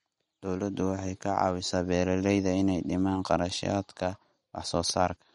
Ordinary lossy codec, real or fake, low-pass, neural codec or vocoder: MP3, 48 kbps; real; 19.8 kHz; none